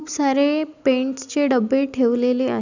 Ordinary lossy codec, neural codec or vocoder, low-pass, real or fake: none; none; 7.2 kHz; real